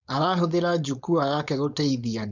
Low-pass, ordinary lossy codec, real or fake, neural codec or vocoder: 7.2 kHz; none; fake; codec, 16 kHz, 4.8 kbps, FACodec